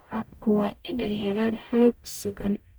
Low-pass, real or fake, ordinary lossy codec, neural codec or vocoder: none; fake; none; codec, 44.1 kHz, 0.9 kbps, DAC